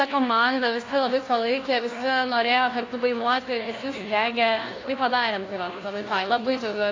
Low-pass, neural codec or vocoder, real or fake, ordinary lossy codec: 7.2 kHz; codec, 16 kHz, 1 kbps, FunCodec, trained on LibriTTS, 50 frames a second; fake; AAC, 32 kbps